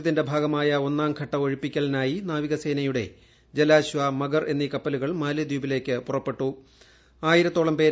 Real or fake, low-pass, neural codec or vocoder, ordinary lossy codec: real; none; none; none